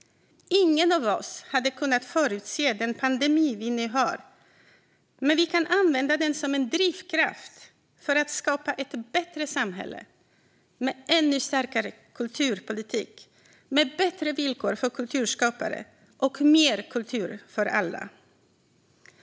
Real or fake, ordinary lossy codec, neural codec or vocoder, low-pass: real; none; none; none